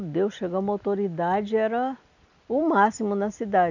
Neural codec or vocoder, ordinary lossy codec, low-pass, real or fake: none; none; 7.2 kHz; real